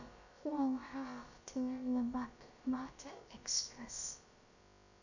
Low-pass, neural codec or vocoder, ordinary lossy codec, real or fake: 7.2 kHz; codec, 16 kHz, about 1 kbps, DyCAST, with the encoder's durations; none; fake